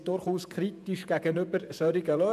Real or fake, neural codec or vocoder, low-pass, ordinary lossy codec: fake; vocoder, 48 kHz, 128 mel bands, Vocos; 14.4 kHz; none